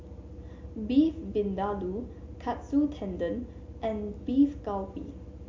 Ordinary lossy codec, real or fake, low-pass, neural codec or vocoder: MP3, 48 kbps; real; 7.2 kHz; none